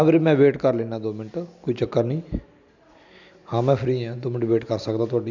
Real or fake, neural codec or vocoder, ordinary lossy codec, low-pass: real; none; none; 7.2 kHz